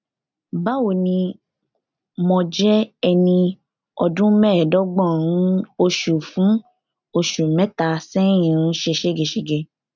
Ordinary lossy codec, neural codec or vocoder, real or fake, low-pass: none; none; real; 7.2 kHz